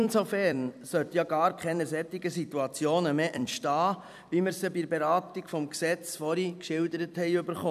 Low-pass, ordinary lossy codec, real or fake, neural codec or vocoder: 14.4 kHz; none; fake; vocoder, 44.1 kHz, 128 mel bands every 256 samples, BigVGAN v2